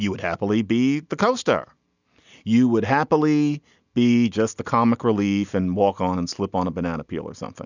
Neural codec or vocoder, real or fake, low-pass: none; real; 7.2 kHz